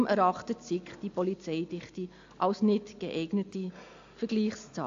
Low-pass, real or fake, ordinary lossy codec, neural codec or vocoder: 7.2 kHz; real; AAC, 48 kbps; none